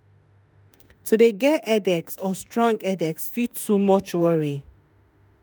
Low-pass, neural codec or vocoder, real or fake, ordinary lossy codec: none; autoencoder, 48 kHz, 32 numbers a frame, DAC-VAE, trained on Japanese speech; fake; none